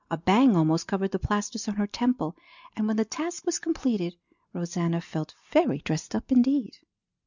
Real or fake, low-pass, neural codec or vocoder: real; 7.2 kHz; none